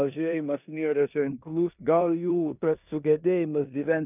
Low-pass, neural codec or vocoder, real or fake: 3.6 kHz; codec, 16 kHz in and 24 kHz out, 0.9 kbps, LongCat-Audio-Codec, four codebook decoder; fake